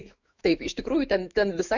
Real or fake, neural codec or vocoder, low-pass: fake; autoencoder, 48 kHz, 128 numbers a frame, DAC-VAE, trained on Japanese speech; 7.2 kHz